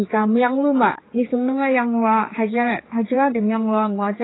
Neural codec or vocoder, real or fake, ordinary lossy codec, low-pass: codec, 16 kHz, 4 kbps, X-Codec, HuBERT features, trained on general audio; fake; AAC, 16 kbps; 7.2 kHz